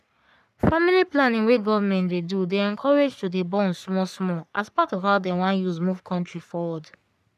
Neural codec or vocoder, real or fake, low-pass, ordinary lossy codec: codec, 44.1 kHz, 3.4 kbps, Pupu-Codec; fake; 14.4 kHz; none